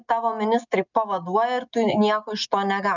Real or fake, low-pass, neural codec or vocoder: real; 7.2 kHz; none